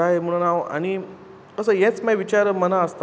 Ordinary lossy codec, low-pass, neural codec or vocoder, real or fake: none; none; none; real